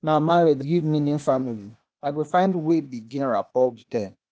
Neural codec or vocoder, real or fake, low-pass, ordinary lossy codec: codec, 16 kHz, 0.8 kbps, ZipCodec; fake; none; none